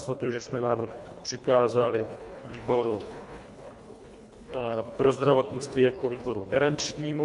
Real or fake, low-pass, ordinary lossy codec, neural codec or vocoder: fake; 10.8 kHz; AAC, 64 kbps; codec, 24 kHz, 1.5 kbps, HILCodec